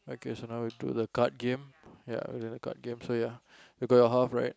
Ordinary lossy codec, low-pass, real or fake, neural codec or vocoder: none; none; real; none